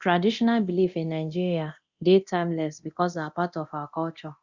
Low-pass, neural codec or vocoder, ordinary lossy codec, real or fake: 7.2 kHz; codec, 24 kHz, 0.9 kbps, DualCodec; Opus, 64 kbps; fake